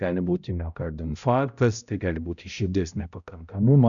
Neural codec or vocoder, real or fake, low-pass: codec, 16 kHz, 0.5 kbps, X-Codec, HuBERT features, trained on balanced general audio; fake; 7.2 kHz